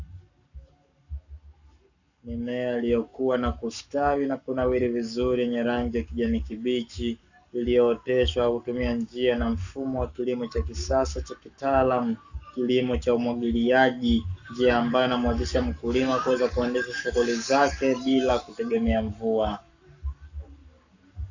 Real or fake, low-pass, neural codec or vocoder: fake; 7.2 kHz; codec, 44.1 kHz, 7.8 kbps, Pupu-Codec